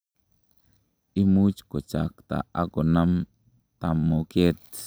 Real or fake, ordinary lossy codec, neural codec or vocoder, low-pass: real; none; none; none